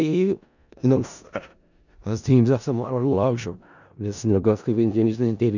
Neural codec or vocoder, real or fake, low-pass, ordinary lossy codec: codec, 16 kHz in and 24 kHz out, 0.4 kbps, LongCat-Audio-Codec, four codebook decoder; fake; 7.2 kHz; none